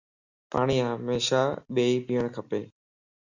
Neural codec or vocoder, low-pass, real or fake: none; 7.2 kHz; real